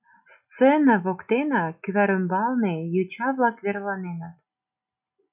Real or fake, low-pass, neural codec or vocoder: real; 3.6 kHz; none